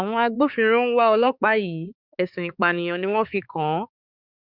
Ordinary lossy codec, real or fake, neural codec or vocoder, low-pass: Opus, 64 kbps; fake; codec, 16 kHz, 4 kbps, X-Codec, HuBERT features, trained on balanced general audio; 5.4 kHz